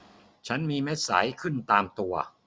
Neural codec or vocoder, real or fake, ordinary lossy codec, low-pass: none; real; none; none